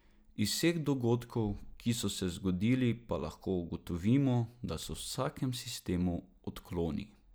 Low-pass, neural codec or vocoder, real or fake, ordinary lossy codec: none; none; real; none